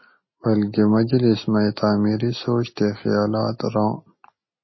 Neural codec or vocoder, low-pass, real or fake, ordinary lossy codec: none; 7.2 kHz; real; MP3, 24 kbps